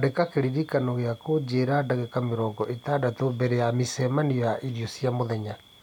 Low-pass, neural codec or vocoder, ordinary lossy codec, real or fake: 19.8 kHz; none; none; real